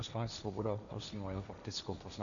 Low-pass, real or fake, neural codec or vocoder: 7.2 kHz; fake; codec, 16 kHz, 1.1 kbps, Voila-Tokenizer